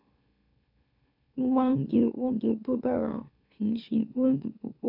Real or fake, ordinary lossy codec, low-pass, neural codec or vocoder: fake; none; 5.4 kHz; autoencoder, 44.1 kHz, a latent of 192 numbers a frame, MeloTTS